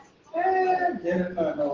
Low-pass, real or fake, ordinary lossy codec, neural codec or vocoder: 7.2 kHz; real; Opus, 16 kbps; none